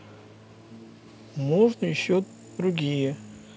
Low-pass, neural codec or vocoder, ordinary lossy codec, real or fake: none; none; none; real